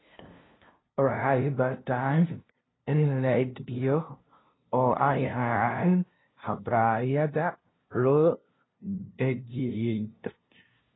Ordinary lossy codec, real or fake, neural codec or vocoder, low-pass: AAC, 16 kbps; fake; codec, 16 kHz, 0.5 kbps, FunCodec, trained on LibriTTS, 25 frames a second; 7.2 kHz